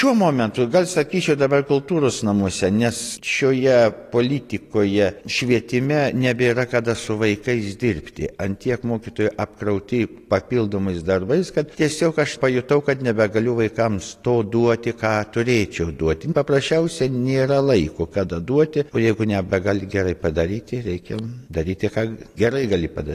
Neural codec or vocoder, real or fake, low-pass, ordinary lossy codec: none; real; 14.4 kHz; AAC, 48 kbps